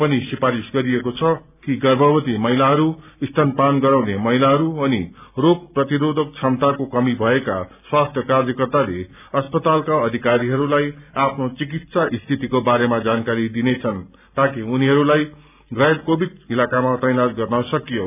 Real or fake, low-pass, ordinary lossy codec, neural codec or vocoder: real; 3.6 kHz; none; none